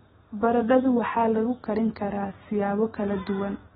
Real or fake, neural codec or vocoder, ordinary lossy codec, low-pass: real; none; AAC, 16 kbps; 19.8 kHz